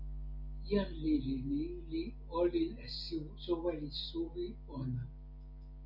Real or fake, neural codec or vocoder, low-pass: real; none; 5.4 kHz